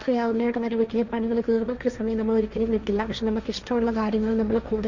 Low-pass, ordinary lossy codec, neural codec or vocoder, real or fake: 7.2 kHz; none; codec, 16 kHz, 1.1 kbps, Voila-Tokenizer; fake